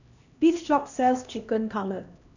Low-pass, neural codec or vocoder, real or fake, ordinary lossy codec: 7.2 kHz; codec, 16 kHz, 1 kbps, X-Codec, HuBERT features, trained on LibriSpeech; fake; none